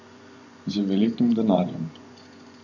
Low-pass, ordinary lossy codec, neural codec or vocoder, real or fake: 7.2 kHz; none; vocoder, 24 kHz, 100 mel bands, Vocos; fake